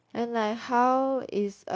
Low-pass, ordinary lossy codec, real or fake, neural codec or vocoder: none; none; fake; codec, 16 kHz, 0.9 kbps, LongCat-Audio-Codec